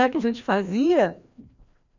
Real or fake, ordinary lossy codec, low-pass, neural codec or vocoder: fake; none; 7.2 kHz; codec, 16 kHz, 1 kbps, FreqCodec, larger model